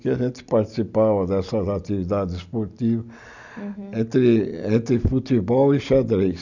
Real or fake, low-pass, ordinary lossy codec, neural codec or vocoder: real; 7.2 kHz; none; none